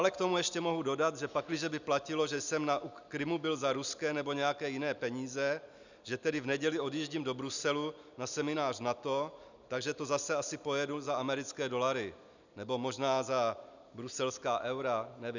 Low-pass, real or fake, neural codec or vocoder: 7.2 kHz; real; none